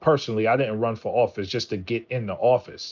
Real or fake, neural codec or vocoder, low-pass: real; none; 7.2 kHz